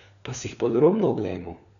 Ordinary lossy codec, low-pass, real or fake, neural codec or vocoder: none; 7.2 kHz; fake; codec, 16 kHz, 4 kbps, FunCodec, trained on LibriTTS, 50 frames a second